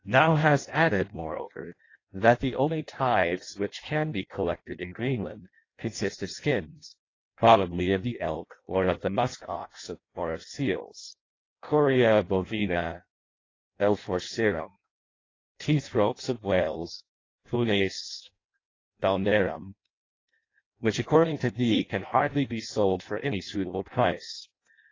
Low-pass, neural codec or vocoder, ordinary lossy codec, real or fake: 7.2 kHz; codec, 16 kHz in and 24 kHz out, 0.6 kbps, FireRedTTS-2 codec; AAC, 32 kbps; fake